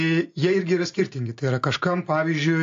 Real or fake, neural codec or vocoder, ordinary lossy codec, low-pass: real; none; AAC, 48 kbps; 7.2 kHz